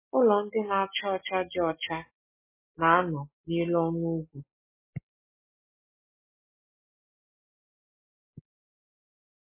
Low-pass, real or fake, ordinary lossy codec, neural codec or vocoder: 3.6 kHz; real; MP3, 16 kbps; none